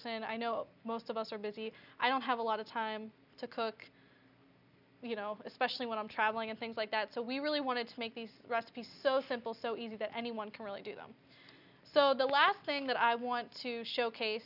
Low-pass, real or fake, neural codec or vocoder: 5.4 kHz; real; none